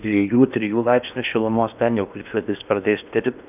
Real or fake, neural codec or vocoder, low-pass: fake; codec, 16 kHz in and 24 kHz out, 0.8 kbps, FocalCodec, streaming, 65536 codes; 3.6 kHz